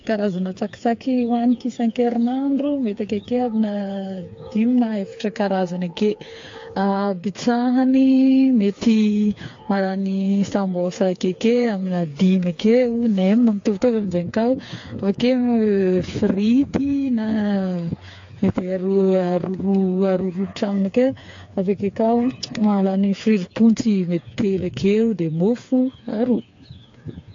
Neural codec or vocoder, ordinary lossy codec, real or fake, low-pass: codec, 16 kHz, 4 kbps, FreqCodec, smaller model; AAC, 48 kbps; fake; 7.2 kHz